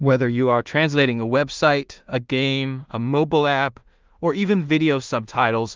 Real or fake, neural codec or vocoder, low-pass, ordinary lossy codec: fake; codec, 16 kHz in and 24 kHz out, 0.4 kbps, LongCat-Audio-Codec, two codebook decoder; 7.2 kHz; Opus, 24 kbps